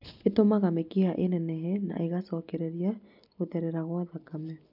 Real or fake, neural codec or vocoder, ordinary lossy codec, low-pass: real; none; none; 5.4 kHz